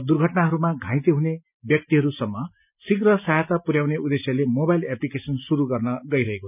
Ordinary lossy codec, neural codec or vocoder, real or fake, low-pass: none; none; real; 3.6 kHz